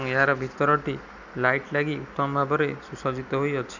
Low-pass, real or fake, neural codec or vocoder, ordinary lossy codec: 7.2 kHz; real; none; none